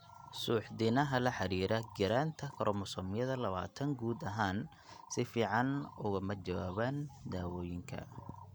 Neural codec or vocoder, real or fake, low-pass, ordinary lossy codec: none; real; none; none